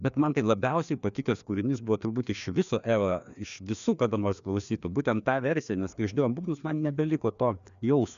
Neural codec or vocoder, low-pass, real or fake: codec, 16 kHz, 2 kbps, FreqCodec, larger model; 7.2 kHz; fake